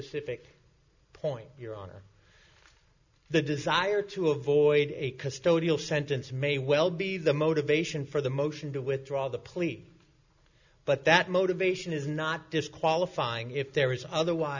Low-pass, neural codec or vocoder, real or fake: 7.2 kHz; none; real